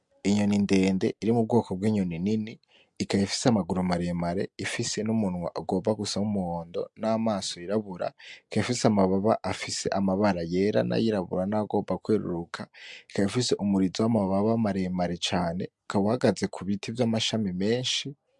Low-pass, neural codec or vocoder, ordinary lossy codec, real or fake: 10.8 kHz; none; MP3, 64 kbps; real